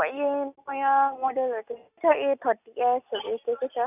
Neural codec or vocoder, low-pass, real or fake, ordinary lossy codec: none; 3.6 kHz; real; none